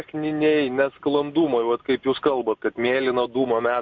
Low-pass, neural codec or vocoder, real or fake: 7.2 kHz; none; real